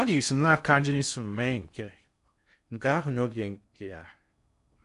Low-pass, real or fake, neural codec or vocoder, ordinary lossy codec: 10.8 kHz; fake; codec, 16 kHz in and 24 kHz out, 0.6 kbps, FocalCodec, streaming, 2048 codes; none